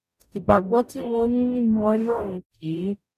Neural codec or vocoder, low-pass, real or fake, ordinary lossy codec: codec, 44.1 kHz, 0.9 kbps, DAC; 14.4 kHz; fake; none